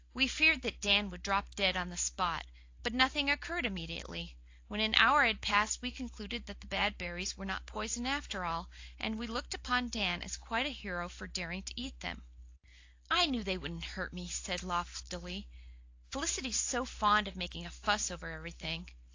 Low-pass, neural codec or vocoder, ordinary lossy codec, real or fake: 7.2 kHz; none; AAC, 48 kbps; real